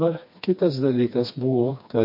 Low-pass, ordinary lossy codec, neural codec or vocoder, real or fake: 5.4 kHz; MP3, 32 kbps; codec, 16 kHz, 2 kbps, FreqCodec, smaller model; fake